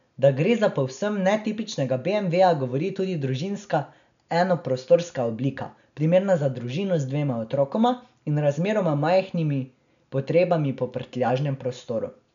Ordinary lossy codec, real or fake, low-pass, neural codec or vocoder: none; real; 7.2 kHz; none